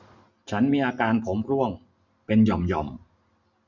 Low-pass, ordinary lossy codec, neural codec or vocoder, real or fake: 7.2 kHz; none; none; real